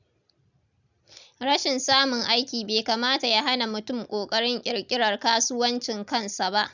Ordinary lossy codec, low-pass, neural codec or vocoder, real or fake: none; 7.2 kHz; none; real